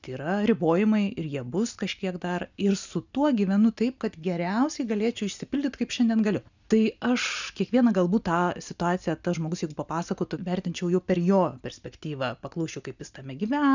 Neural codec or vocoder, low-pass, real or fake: none; 7.2 kHz; real